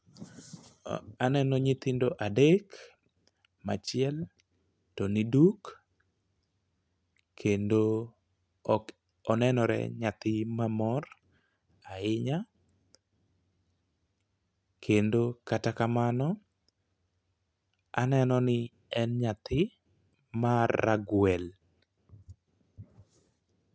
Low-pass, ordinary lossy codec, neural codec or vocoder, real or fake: none; none; none; real